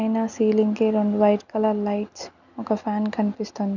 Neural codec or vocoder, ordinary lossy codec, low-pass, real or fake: none; none; 7.2 kHz; real